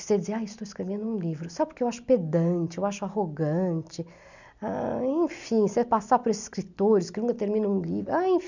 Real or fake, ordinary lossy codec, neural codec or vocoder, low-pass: real; none; none; 7.2 kHz